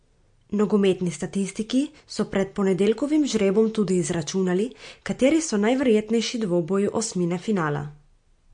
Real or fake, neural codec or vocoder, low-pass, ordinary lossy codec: real; none; 9.9 kHz; MP3, 48 kbps